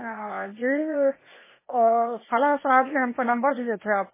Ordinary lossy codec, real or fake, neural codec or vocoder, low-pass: MP3, 16 kbps; fake; codec, 16 kHz, 0.8 kbps, ZipCodec; 3.6 kHz